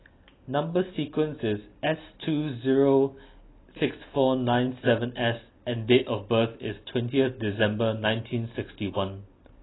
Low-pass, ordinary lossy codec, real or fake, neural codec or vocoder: 7.2 kHz; AAC, 16 kbps; real; none